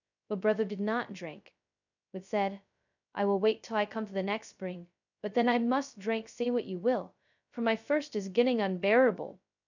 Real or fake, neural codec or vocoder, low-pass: fake; codec, 16 kHz, 0.2 kbps, FocalCodec; 7.2 kHz